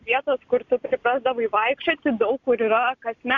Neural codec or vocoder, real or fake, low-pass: none; real; 7.2 kHz